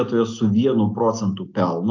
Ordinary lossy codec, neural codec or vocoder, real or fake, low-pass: AAC, 48 kbps; none; real; 7.2 kHz